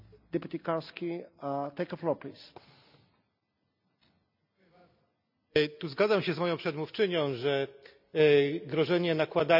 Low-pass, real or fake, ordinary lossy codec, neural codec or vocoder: 5.4 kHz; real; none; none